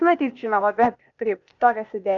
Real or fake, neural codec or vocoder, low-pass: fake; codec, 16 kHz, 0.8 kbps, ZipCodec; 7.2 kHz